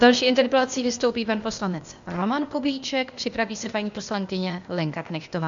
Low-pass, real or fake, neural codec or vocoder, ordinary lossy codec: 7.2 kHz; fake; codec, 16 kHz, 0.8 kbps, ZipCodec; MP3, 64 kbps